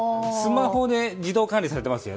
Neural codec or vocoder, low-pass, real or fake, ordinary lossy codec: none; none; real; none